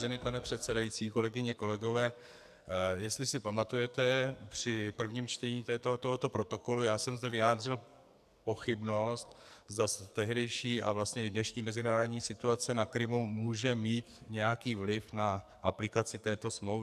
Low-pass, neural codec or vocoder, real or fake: 14.4 kHz; codec, 44.1 kHz, 2.6 kbps, SNAC; fake